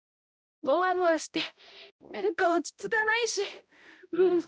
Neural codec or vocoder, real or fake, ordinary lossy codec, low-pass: codec, 16 kHz, 0.5 kbps, X-Codec, HuBERT features, trained on balanced general audio; fake; none; none